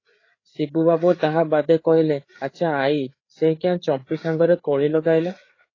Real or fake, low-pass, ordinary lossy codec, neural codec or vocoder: fake; 7.2 kHz; AAC, 32 kbps; codec, 16 kHz, 4 kbps, FreqCodec, larger model